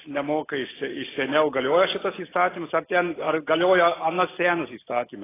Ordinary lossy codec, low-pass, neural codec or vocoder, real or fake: AAC, 16 kbps; 3.6 kHz; none; real